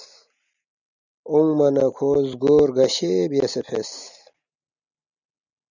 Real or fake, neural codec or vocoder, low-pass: real; none; 7.2 kHz